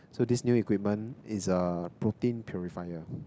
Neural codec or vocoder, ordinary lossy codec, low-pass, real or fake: none; none; none; real